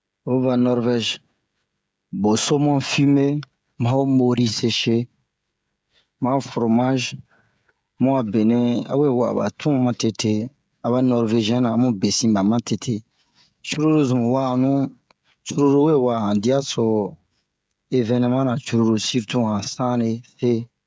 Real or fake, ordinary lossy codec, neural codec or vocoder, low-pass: fake; none; codec, 16 kHz, 16 kbps, FreqCodec, smaller model; none